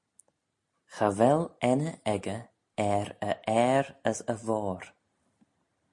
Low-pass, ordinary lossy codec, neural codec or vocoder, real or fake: 10.8 kHz; MP3, 48 kbps; none; real